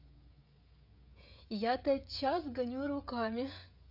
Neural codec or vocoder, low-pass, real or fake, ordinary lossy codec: codec, 16 kHz, 16 kbps, FreqCodec, smaller model; 5.4 kHz; fake; none